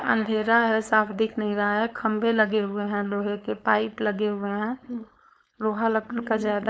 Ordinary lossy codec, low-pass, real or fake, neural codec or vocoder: none; none; fake; codec, 16 kHz, 4.8 kbps, FACodec